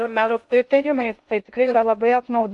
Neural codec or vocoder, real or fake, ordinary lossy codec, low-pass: codec, 16 kHz in and 24 kHz out, 0.6 kbps, FocalCodec, streaming, 2048 codes; fake; MP3, 64 kbps; 10.8 kHz